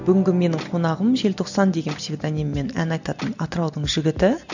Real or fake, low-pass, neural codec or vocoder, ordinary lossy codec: real; 7.2 kHz; none; none